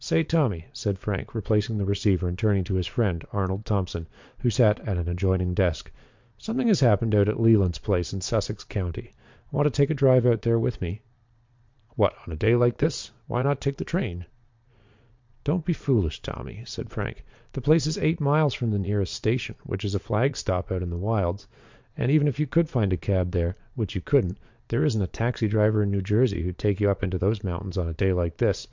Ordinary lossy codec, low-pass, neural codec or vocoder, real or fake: MP3, 64 kbps; 7.2 kHz; vocoder, 22.05 kHz, 80 mel bands, Vocos; fake